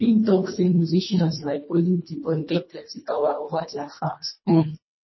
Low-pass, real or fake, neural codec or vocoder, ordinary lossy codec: 7.2 kHz; fake; codec, 24 kHz, 1.5 kbps, HILCodec; MP3, 24 kbps